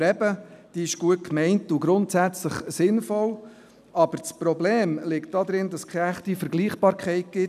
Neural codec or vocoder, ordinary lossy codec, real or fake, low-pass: none; none; real; 14.4 kHz